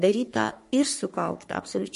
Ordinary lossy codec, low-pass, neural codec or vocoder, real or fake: MP3, 48 kbps; 14.4 kHz; codec, 44.1 kHz, 3.4 kbps, Pupu-Codec; fake